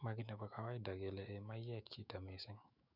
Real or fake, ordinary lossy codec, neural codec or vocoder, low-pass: fake; none; autoencoder, 48 kHz, 128 numbers a frame, DAC-VAE, trained on Japanese speech; 5.4 kHz